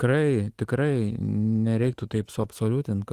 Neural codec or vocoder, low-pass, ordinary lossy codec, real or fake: autoencoder, 48 kHz, 128 numbers a frame, DAC-VAE, trained on Japanese speech; 14.4 kHz; Opus, 24 kbps; fake